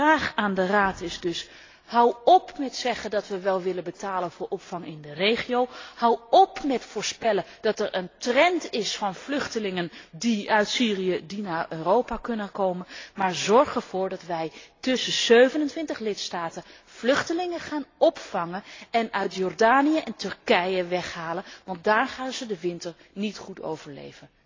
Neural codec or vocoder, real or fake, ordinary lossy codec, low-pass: none; real; AAC, 32 kbps; 7.2 kHz